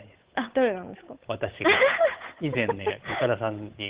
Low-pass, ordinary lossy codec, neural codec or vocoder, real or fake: 3.6 kHz; Opus, 16 kbps; codec, 16 kHz, 8 kbps, FunCodec, trained on Chinese and English, 25 frames a second; fake